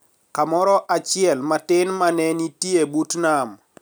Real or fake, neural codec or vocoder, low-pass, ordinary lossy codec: real; none; none; none